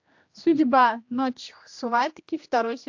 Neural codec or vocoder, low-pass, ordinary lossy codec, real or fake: codec, 16 kHz, 1 kbps, X-Codec, HuBERT features, trained on general audio; 7.2 kHz; AAC, 48 kbps; fake